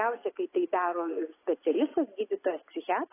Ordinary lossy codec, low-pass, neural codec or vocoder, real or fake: AAC, 16 kbps; 3.6 kHz; none; real